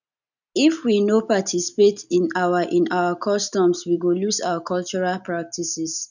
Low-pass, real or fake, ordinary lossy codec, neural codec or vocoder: 7.2 kHz; real; none; none